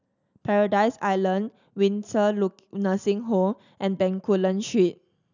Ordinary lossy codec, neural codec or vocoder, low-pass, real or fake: none; none; 7.2 kHz; real